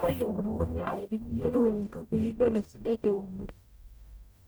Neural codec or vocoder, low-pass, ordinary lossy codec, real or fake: codec, 44.1 kHz, 0.9 kbps, DAC; none; none; fake